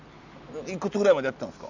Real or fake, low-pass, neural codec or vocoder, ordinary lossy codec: real; 7.2 kHz; none; none